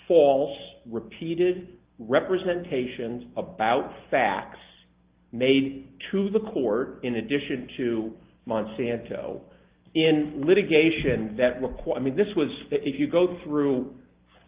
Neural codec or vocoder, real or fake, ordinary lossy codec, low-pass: none; real; Opus, 32 kbps; 3.6 kHz